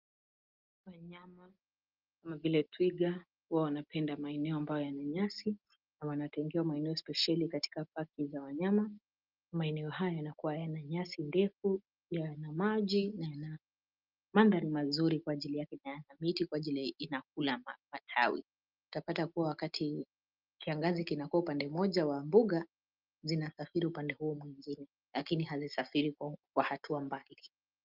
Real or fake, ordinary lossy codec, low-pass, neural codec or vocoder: real; Opus, 32 kbps; 5.4 kHz; none